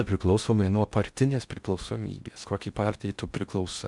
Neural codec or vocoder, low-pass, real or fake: codec, 16 kHz in and 24 kHz out, 0.6 kbps, FocalCodec, streaming, 4096 codes; 10.8 kHz; fake